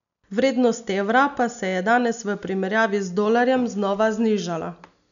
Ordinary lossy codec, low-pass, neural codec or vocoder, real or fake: none; 7.2 kHz; none; real